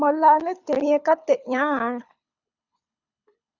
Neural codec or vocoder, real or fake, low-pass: codec, 24 kHz, 6 kbps, HILCodec; fake; 7.2 kHz